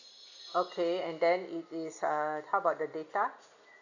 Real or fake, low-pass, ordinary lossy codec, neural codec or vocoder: real; 7.2 kHz; none; none